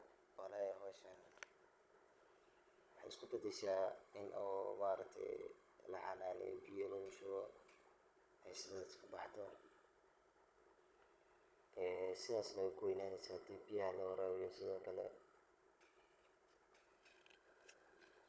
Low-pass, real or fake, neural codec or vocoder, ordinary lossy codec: none; fake; codec, 16 kHz, 16 kbps, FunCodec, trained on Chinese and English, 50 frames a second; none